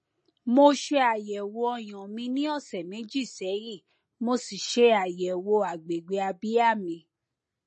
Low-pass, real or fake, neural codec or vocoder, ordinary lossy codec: 10.8 kHz; real; none; MP3, 32 kbps